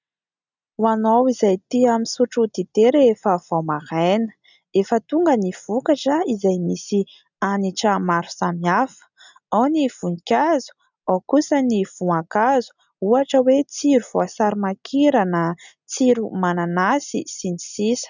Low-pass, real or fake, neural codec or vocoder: 7.2 kHz; real; none